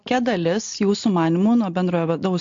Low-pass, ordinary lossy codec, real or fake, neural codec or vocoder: 7.2 kHz; MP3, 48 kbps; real; none